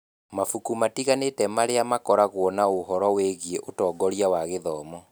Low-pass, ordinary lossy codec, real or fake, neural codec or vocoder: none; none; real; none